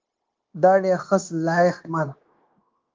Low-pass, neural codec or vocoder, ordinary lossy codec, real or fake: 7.2 kHz; codec, 16 kHz, 0.9 kbps, LongCat-Audio-Codec; Opus, 32 kbps; fake